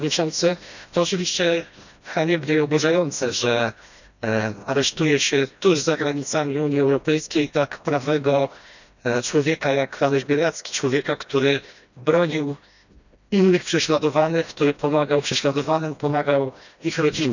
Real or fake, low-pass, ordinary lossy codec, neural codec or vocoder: fake; 7.2 kHz; none; codec, 16 kHz, 1 kbps, FreqCodec, smaller model